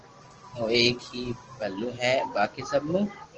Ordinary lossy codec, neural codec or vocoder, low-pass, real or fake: Opus, 16 kbps; none; 7.2 kHz; real